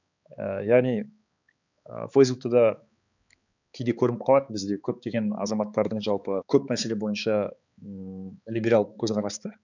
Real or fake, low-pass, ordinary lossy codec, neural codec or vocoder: fake; 7.2 kHz; none; codec, 16 kHz, 4 kbps, X-Codec, HuBERT features, trained on balanced general audio